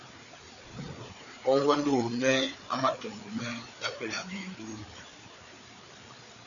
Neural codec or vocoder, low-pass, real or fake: codec, 16 kHz, 4 kbps, FreqCodec, larger model; 7.2 kHz; fake